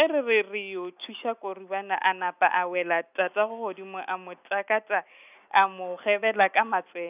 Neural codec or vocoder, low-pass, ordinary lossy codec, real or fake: none; 3.6 kHz; none; real